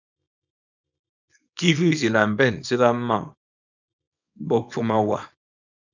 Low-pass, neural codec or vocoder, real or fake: 7.2 kHz; codec, 24 kHz, 0.9 kbps, WavTokenizer, small release; fake